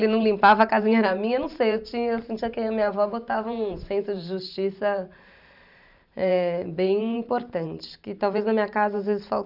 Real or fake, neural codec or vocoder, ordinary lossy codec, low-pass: fake; vocoder, 44.1 kHz, 128 mel bands every 512 samples, BigVGAN v2; none; 5.4 kHz